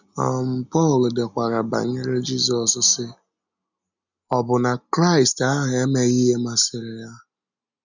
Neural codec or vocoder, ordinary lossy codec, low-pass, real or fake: none; none; 7.2 kHz; real